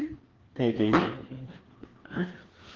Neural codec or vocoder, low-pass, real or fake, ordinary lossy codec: codec, 16 kHz, 2 kbps, FreqCodec, larger model; 7.2 kHz; fake; Opus, 16 kbps